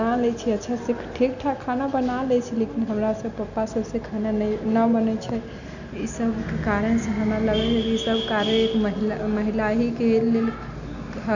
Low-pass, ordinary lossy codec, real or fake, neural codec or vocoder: 7.2 kHz; none; real; none